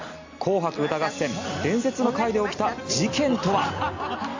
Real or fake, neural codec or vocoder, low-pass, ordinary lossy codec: real; none; 7.2 kHz; AAC, 48 kbps